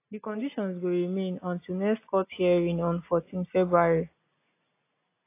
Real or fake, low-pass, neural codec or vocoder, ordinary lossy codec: real; 3.6 kHz; none; AAC, 24 kbps